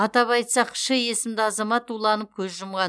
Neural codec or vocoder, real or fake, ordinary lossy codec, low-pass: none; real; none; none